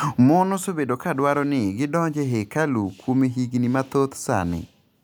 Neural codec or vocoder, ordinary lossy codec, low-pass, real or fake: none; none; none; real